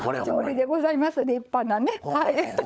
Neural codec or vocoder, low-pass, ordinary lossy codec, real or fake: codec, 16 kHz, 16 kbps, FunCodec, trained on LibriTTS, 50 frames a second; none; none; fake